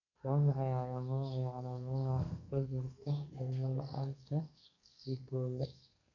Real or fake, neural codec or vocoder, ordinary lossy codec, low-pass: fake; codec, 32 kHz, 1.9 kbps, SNAC; none; 7.2 kHz